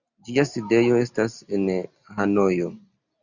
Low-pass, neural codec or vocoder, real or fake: 7.2 kHz; none; real